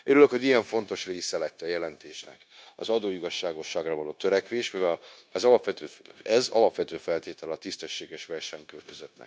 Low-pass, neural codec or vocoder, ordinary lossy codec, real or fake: none; codec, 16 kHz, 0.9 kbps, LongCat-Audio-Codec; none; fake